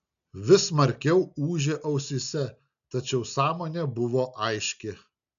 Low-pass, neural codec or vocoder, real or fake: 7.2 kHz; none; real